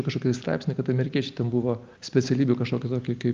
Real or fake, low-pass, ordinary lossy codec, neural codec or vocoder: real; 7.2 kHz; Opus, 24 kbps; none